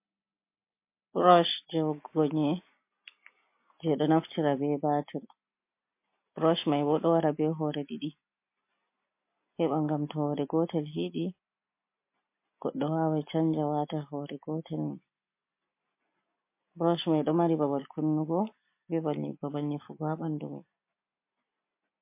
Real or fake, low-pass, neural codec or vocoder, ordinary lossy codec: real; 3.6 kHz; none; MP3, 24 kbps